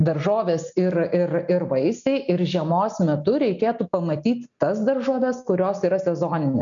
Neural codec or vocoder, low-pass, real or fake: none; 7.2 kHz; real